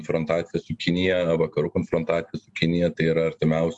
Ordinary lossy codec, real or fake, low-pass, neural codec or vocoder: MP3, 64 kbps; real; 10.8 kHz; none